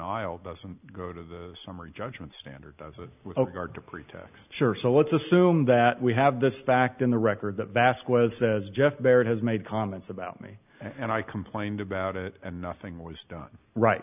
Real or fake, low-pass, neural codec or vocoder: real; 3.6 kHz; none